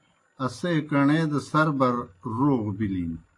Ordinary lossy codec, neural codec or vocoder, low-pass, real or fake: AAC, 48 kbps; none; 10.8 kHz; real